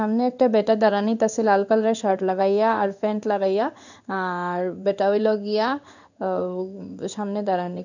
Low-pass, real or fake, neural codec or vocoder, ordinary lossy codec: 7.2 kHz; fake; codec, 16 kHz in and 24 kHz out, 1 kbps, XY-Tokenizer; none